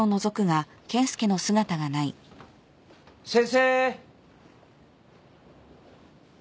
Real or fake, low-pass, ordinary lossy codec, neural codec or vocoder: real; none; none; none